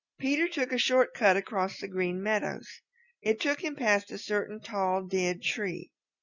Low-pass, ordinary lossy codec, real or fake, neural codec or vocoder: 7.2 kHz; Opus, 64 kbps; real; none